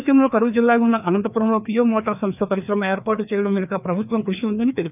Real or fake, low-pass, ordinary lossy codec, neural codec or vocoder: fake; 3.6 kHz; none; codec, 16 kHz, 2 kbps, FreqCodec, larger model